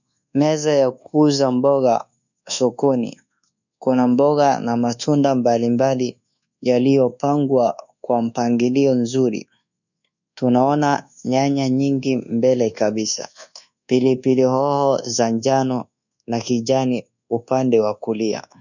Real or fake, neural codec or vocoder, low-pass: fake; codec, 24 kHz, 1.2 kbps, DualCodec; 7.2 kHz